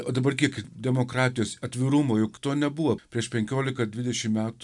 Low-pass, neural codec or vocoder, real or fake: 10.8 kHz; none; real